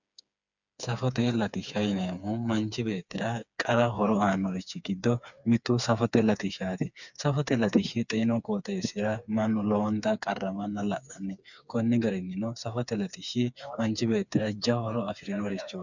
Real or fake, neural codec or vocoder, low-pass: fake; codec, 16 kHz, 4 kbps, FreqCodec, smaller model; 7.2 kHz